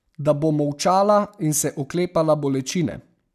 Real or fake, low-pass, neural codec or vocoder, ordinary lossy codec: real; 14.4 kHz; none; none